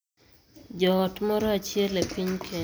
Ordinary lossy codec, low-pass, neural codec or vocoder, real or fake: none; none; none; real